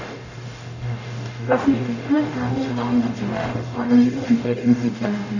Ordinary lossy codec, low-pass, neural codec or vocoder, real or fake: none; 7.2 kHz; codec, 44.1 kHz, 0.9 kbps, DAC; fake